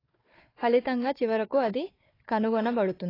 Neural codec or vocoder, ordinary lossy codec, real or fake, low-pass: codec, 16 kHz, 4 kbps, FunCodec, trained on Chinese and English, 50 frames a second; AAC, 24 kbps; fake; 5.4 kHz